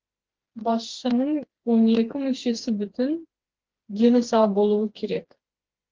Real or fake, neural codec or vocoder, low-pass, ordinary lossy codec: fake; codec, 16 kHz, 2 kbps, FreqCodec, smaller model; 7.2 kHz; Opus, 16 kbps